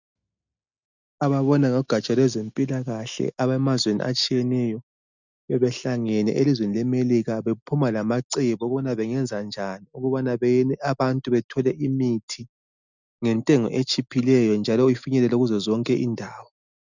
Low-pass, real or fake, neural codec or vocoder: 7.2 kHz; real; none